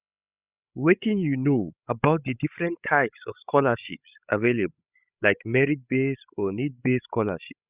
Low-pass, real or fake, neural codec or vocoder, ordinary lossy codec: 3.6 kHz; fake; codec, 16 kHz, 16 kbps, FreqCodec, larger model; Opus, 64 kbps